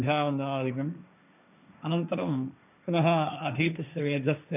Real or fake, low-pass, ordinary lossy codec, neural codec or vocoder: fake; 3.6 kHz; none; codec, 16 kHz, 1.1 kbps, Voila-Tokenizer